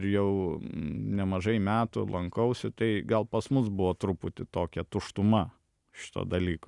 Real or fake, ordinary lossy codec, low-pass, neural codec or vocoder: real; Opus, 64 kbps; 10.8 kHz; none